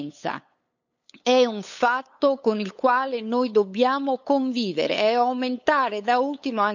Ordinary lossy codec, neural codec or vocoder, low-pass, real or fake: none; codec, 16 kHz, 8 kbps, FunCodec, trained on LibriTTS, 25 frames a second; 7.2 kHz; fake